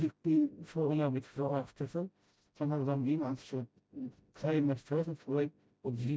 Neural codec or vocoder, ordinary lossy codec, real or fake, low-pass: codec, 16 kHz, 0.5 kbps, FreqCodec, smaller model; none; fake; none